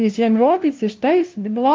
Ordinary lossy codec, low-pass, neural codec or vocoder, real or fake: Opus, 32 kbps; 7.2 kHz; codec, 16 kHz, 0.5 kbps, FunCodec, trained on LibriTTS, 25 frames a second; fake